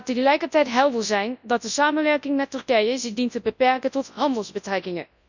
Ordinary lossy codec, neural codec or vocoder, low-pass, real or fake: none; codec, 24 kHz, 0.9 kbps, WavTokenizer, large speech release; 7.2 kHz; fake